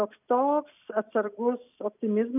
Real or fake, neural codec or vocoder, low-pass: real; none; 3.6 kHz